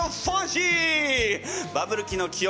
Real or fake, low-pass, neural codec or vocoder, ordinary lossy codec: real; none; none; none